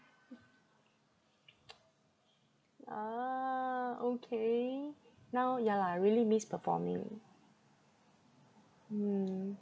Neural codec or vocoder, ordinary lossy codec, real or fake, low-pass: none; none; real; none